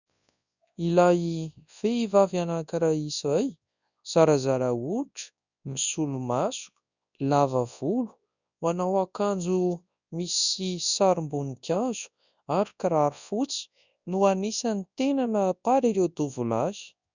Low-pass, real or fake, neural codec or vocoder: 7.2 kHz; fake; codec, 24 kHz, 0.9 kbps, WavTokenizer, large speech release